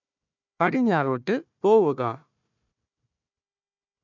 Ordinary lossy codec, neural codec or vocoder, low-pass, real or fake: none; codec, 16 kHz, 1 kbps, FunCodec, trained on Chinese and English, 50 frames a second; 7.2 kHz; fake